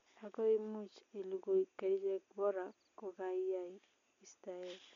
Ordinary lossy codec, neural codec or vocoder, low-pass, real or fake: MP3, 64 kbps; none; 7.2 kHz; real